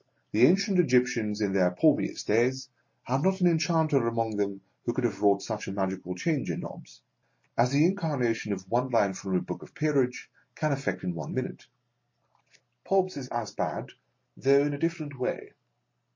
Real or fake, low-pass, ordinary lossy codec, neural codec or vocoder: real; 7.2 kHz; MP3, 32 kbps; none